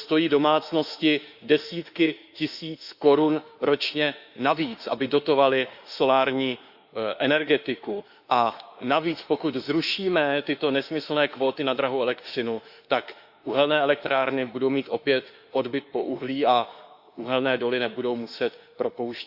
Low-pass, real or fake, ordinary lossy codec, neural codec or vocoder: 5.4 kHz; fake; Opus, 64 kbps; autoencoder, 48 kHz, 32 numbers a frame, DAC-VAE, trained on Japanese speech